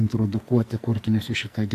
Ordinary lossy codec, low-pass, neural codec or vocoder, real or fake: AAC, 64 kbps; 14.4 kHz; codec, 44.1 kHz, 2.6 kbps, SNAC; fake